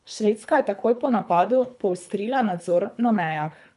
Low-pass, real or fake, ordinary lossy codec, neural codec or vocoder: 10.8 kHz; fake; none; codec, 24 kHz, 3 kbps, HILCodec